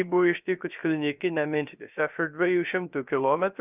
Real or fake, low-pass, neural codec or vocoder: fake; 3.6 kHz; codec, 16 kHz, 0.3 kbps, FocalCodec